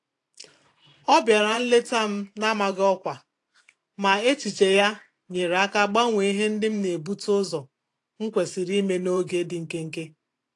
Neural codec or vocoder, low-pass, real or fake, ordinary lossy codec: vocoder, 44.1 kHz, 128 mel bands every 512 samples, BigVGAN v2; 10.8 kHz; fake; AAC, 48 kbps